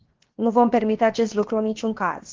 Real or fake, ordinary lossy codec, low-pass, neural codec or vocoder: fake; Opus, 16 kbps; 7.2 kHz; codec, 16 kHz, 0.7 kbps, FocalCodec